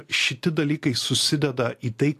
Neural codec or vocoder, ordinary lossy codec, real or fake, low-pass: none; AAC, 64 kbps; real; 14.4 kHz